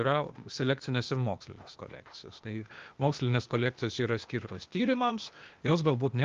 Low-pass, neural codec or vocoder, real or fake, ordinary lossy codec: 7.2 kHz; codec, 16 kHz, 0.8 kbps, ZipCodec; fake; Opus, 32 kbps